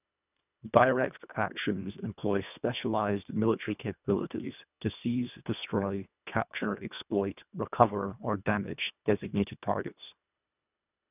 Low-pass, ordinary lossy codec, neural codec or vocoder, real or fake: 3.6 kHz; none; codec, 24 kHz, 1.5 kbps, HILCodec; fake